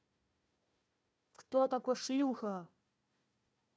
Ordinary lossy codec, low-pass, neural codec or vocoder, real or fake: none; none; codec, 16 kHz, 1 kbps, FunCodec, trained on Chinese and English, 50 frames a second; fake